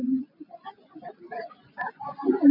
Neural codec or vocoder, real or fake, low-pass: vocoder, 24 kHz, 100 mel bands, Vocos; fake; 5.4 kHz